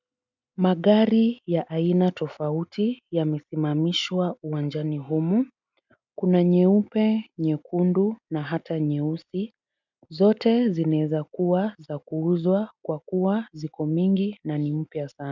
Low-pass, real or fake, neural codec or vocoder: 7.2 kHz; real; none